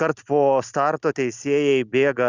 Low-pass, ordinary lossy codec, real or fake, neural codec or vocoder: 7.2 kHz; Opus, 64 kbps; real; none